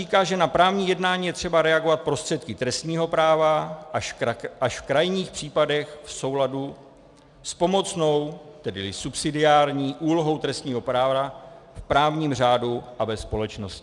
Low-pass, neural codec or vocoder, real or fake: 10.8 kHz; none; real